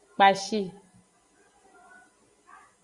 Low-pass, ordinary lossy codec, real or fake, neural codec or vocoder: 10.8 kHz; Opus, 64 kbps; real; none